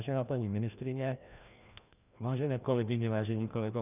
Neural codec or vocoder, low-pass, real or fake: codec, 16 kHz, 1 kbps, FreqCodec, larger model; 3.6 kHz; fake